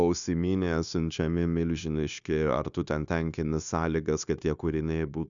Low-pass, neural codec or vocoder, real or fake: 7.2 kHz; codec, 16 kHz, 0.9 kbps, LongCat-Audio-Codec; fake